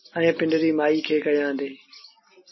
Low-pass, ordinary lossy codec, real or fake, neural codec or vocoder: 7.2 kHz; MP3, 24 kbps; real; none